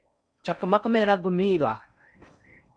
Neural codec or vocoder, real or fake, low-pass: codec, 16 kHz in and 24 kHz out, 0.6 kbps, FocalCodec, streaming, 4096 codes; fake; 9.9 kHz